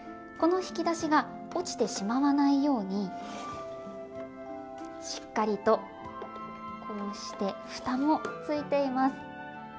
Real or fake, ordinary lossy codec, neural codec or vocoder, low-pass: real; none; none; none